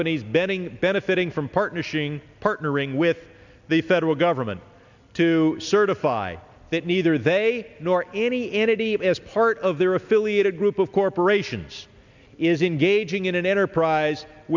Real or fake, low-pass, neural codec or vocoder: real; 7.2 kHz; none